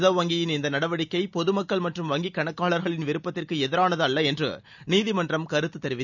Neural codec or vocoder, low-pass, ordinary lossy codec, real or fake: none; 7.2 kHz; none; real